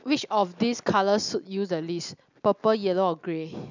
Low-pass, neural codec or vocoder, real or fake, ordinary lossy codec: 7.2 kHz; none; real; none